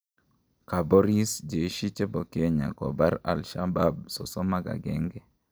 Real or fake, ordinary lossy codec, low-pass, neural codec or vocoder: real; none; none; none